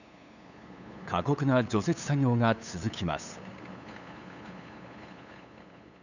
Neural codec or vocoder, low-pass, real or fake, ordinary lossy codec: codec, 16 kHz, 8 kbps, FunCodec, trained on LibriTTS, 25 frames a second; 7.2 kHz; fake; none